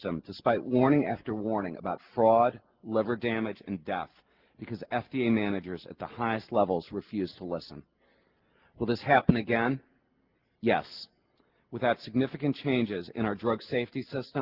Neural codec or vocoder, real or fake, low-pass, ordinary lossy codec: none; real; 5.4 kHz; Opus, 16 kbps